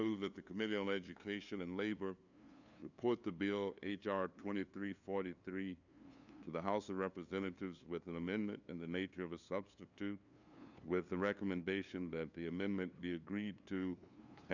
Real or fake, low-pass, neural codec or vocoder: fake; 7.2 kHz; codec, 16 kHz, 2 kbps, FunCodec, trained on LibriTTS, 25 frames a second